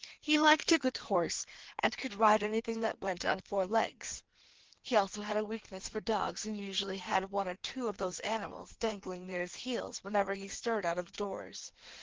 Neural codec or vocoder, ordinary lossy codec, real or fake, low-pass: codec, 16 kHz in and 24 kHz out, 1.1 kbps, FireRedTTS-2 codec; Opus, 16 kbps; fake; 7.2 kHz